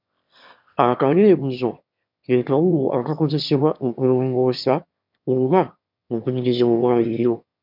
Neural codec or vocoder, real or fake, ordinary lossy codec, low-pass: autoencoder, 22.05 kHz, a latent of 192 numbers a frame, VITS, trained on one speaker; fake; MP3, 48 kbps; 5.4 kHz